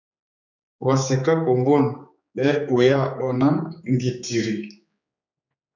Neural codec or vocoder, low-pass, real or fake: codec, 16 kHz, 4 kbps, X-Codec, HuBERT features, trained on general audio; 7.2 kHz; fake